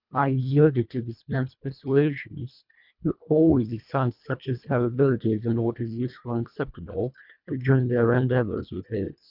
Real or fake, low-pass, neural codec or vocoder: fake; 5.4 kHz; codec, 24 kHz, 1.5 kbps, HILCodec